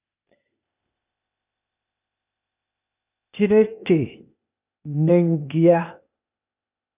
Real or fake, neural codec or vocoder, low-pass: fake; codec, 16 kHz, 0.8 kbps, ZipCodec; 3.6 kHz